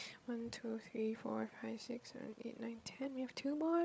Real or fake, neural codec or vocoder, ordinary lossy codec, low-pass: fake; codec, 16 kHz, 16 kbps, FreqCodec, larger model; none; none